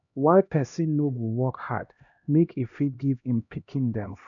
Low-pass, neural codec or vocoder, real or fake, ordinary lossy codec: 7.2 kHz; codec, 16 kHz, 1 kbps, X-Codec, HuBERT features, trained on LibriSpeech; fake; none